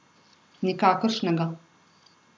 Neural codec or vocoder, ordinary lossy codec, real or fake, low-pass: none; none; real; none